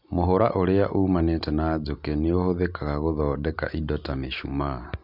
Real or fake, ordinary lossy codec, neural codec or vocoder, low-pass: real; none; none; 5.4 kHz